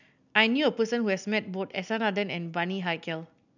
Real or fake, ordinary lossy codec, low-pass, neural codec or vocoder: real; none; 7.2 kHz; none